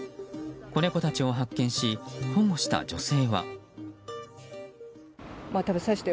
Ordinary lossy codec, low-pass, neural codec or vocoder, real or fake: none; none; none; real